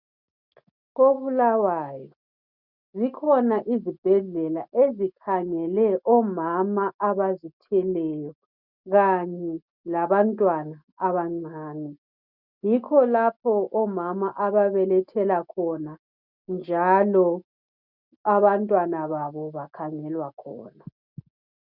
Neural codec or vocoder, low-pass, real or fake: none; 5.4 kHz; real